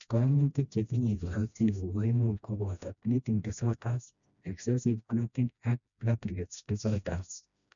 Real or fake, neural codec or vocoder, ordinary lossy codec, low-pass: fake; codec, 16 kHz, 1 kbps, FreqCodec, smaller model; none; 7.2 kHz